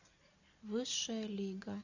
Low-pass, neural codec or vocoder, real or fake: 7.2 kHz; none; real